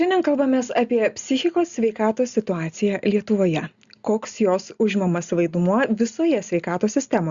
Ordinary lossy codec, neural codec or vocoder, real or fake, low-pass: Opus, 64 kbps; none; real; 7.2 kHz